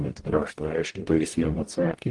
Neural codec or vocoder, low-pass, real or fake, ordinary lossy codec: codec, 44.1 kHz, 0.9 kbps, DAC; 10.8 kHz; fake; Opus, 24 kbps